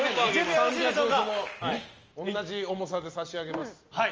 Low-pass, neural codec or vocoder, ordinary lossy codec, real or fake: 7.2 kHz; none; Opus, 24 kbps; real